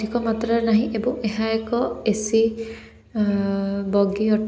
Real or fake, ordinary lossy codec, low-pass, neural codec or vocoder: real; none; none; none